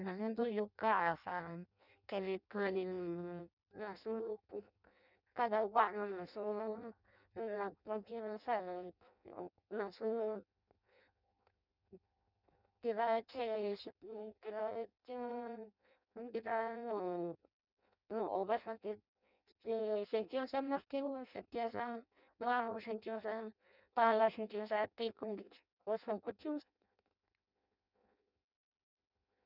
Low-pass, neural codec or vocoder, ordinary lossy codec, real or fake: 5.4 kHz; codec, 16 kHz in and 24 kHz out, 0.6 kbps, FireRedTTS-2 codec; none; fake